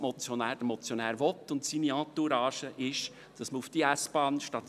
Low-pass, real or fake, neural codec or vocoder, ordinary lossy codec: 14.4 kHz; real; none; none